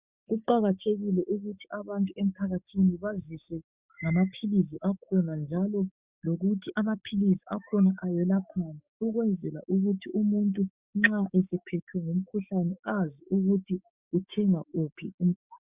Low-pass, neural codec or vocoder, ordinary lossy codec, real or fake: 3.6 kHz; codec, 16 kHz, 6 kbps, DAC; Opus, 64 kbps; fake